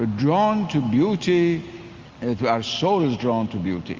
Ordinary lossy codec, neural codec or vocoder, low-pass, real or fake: Opus, 32 kbps; none; 7.2 kHz; real